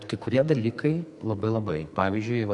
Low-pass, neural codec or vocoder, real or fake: 10.8 kHz; codec, 44.1 kHz, 2.6 kbps, SNAC; fake